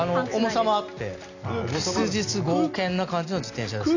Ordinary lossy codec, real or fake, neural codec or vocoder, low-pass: none; real; none; 7.2 kHz